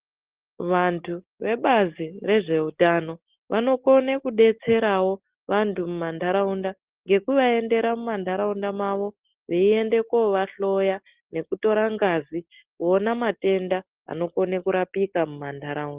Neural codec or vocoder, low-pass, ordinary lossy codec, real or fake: none; 3.6 kHz; Opus, 16 kbps; real